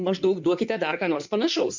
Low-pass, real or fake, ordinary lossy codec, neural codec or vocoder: 7.2 kHz; fake; MP3, 64 kbps; codec, 16 kHz in and 24 kHz out, 2.2 kbps, FireRedTTS-2 codec